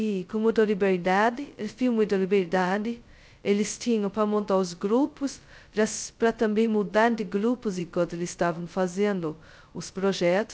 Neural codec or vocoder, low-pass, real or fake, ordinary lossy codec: codec, 16 kHz, 0.2 kbps, FocalCodec; none; fake; none